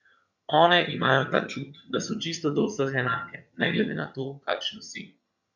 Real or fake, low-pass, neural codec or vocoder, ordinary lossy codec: fake; 7.2 kHz; vocoder, 22.05 kHz, 80 mel bands, HiFi-GAN; none